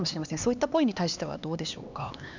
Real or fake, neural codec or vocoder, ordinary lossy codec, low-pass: fake; codec, 16 kHz, 2 kbps, X-Codec, HuBERT features, trained on LibriSpeech; none; 7.2 kHz